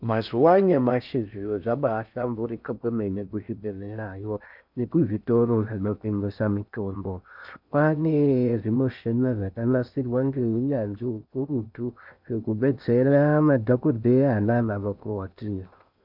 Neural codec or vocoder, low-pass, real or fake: codec, 16 kHz in and 24 kHz out, 0.6 kbps, FocalCodec, streaming, 4096 codes; 5.4 kHz; fake